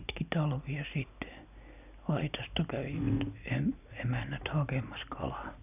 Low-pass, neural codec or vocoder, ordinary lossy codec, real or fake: 3.6 kHz; none; none; real